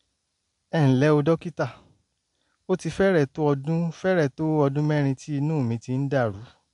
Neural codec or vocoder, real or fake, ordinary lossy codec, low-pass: none; real; MP3, 64 kbps; 10.8 kHz